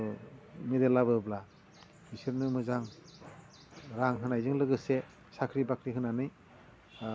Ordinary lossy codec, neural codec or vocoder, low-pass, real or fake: none; none; none; real